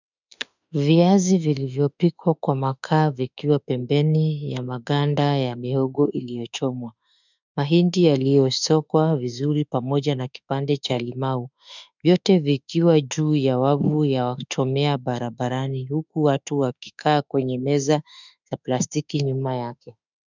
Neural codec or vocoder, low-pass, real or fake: autoencoder, 48 kHz, 32 numbers a frame, DAC-VAE, trained on Japanese speech; 7.2 kHz; fake